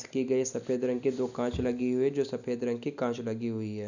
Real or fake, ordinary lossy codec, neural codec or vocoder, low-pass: real; none; none; 7.2 kHz